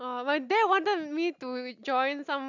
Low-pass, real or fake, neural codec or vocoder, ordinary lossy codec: 7.2 kHz; real; none; none